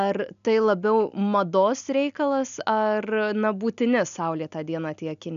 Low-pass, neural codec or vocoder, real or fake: 7.2 kHz; none; real